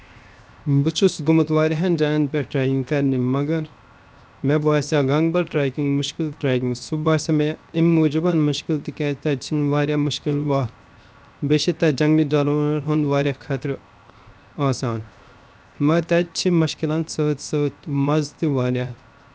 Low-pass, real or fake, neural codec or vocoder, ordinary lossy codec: none; fake; codec, 16 kHz, 0.7 kbps, FocalCodec; none